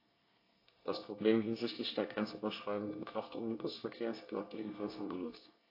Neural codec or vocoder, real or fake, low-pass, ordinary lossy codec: codec, 24 kHz, 1 kbps, SNAC; fake; 5.4 kHz; AAC, 32 kbps